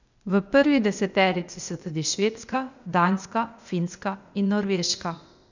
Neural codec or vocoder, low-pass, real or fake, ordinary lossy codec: codec, 16 kHz, 0.8 kbps, ZipCodec; 7.2 kHz; fake; none